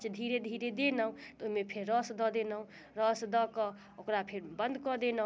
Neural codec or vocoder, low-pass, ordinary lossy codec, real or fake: none; none; none; real